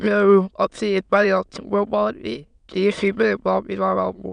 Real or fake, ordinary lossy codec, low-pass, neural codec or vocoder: fake; none; 9.9 kHz; autoencoder, 22.05 kHz, a latent of 192 numbers a frame, VITS, trained on many speakers